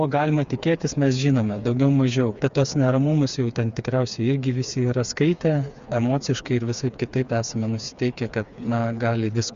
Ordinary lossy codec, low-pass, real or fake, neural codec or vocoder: Opus, 64 kbps; 7.2 kHz; fake; codec, 16 kHz, 4 kbps, FreqCodec, smaller model